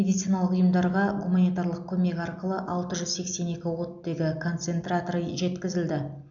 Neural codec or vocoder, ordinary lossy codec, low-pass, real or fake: none; none; 7.2 kHz; real